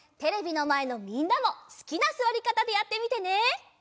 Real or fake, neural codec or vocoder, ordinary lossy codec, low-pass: real; none; none; none